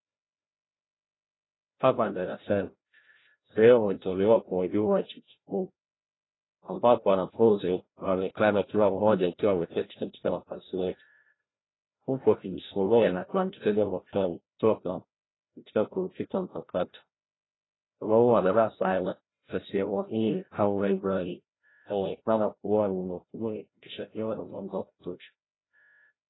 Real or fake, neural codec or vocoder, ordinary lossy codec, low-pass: fake; codec, 16 kHz, 0.5 kbps, FreqCodec, larger model; AAC, 16 kbps; 7.2 kHz